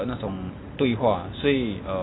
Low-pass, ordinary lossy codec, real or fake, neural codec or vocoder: 7.2 kHz; AAC, 16 kbps; real; none